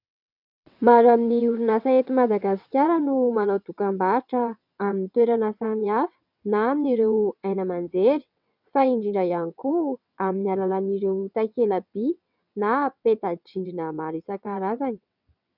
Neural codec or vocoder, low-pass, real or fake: vocoder, 22.05 kHz, 80 mel bands, WaveNeXt; 5.4 kHz; fake